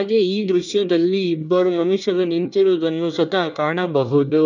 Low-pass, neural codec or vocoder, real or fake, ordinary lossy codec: 7.2 kHz; codec, 24 kHz, 1 kbps, SNAC; fake; none